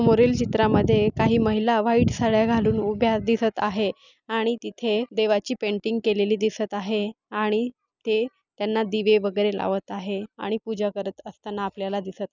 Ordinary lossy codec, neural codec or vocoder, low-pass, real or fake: none; none; 7.2 kHz; real